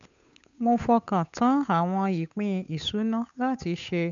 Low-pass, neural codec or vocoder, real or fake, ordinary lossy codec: 7.2 kHz; codec, 16 kHz, 8 kbps, FunCodec, trained on Chinese and English, 25 frames a second; fake; none